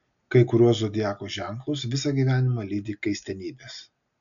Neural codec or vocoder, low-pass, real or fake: none; 7.2 kHz; real